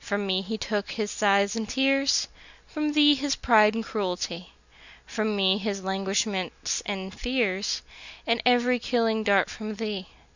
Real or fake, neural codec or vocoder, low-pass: real; none; 7.2 kHz